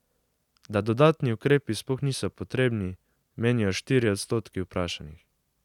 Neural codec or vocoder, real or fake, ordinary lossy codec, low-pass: none; real; none; 19.8 kHz